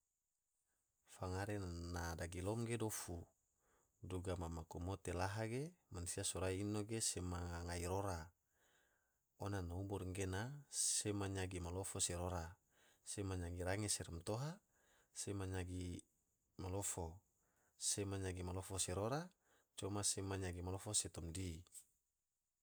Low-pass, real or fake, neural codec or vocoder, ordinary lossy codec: none; real; none; none